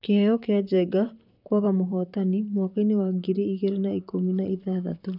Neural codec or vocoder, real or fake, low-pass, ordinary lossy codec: vocoder, 44.1 kHz, 128 mel bands, Pupu-Vocoder; fake; 5.4 kHz; none